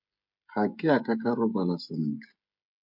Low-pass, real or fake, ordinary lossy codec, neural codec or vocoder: 5.4 kHz; fake; MP3, 48 kbps; codec, 16 kHz, 16 kbps, FreqCodec, smaller model